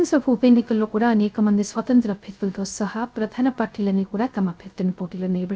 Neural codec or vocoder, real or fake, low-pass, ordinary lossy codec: codec, 16 kHz, 0.3 kbps, FocalCodec; fake; none; none